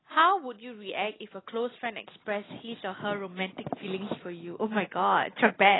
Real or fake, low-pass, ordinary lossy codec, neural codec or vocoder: real; 7.2 kHz; AAC, 16 kbps; none